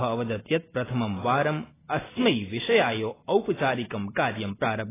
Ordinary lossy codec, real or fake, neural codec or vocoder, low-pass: AAC, 16 kbps; real; none; 3.6 kHz